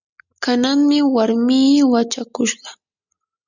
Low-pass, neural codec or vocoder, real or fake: 7.2 kHz; none; real